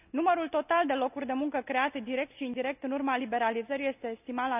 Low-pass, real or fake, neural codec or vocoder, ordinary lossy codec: 3.6 kHz; real; none; none